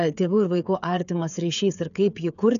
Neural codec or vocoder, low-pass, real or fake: codec, 16 kHz, 8 kbps, FreqCodec, smaller model; 7.2 kHz; fake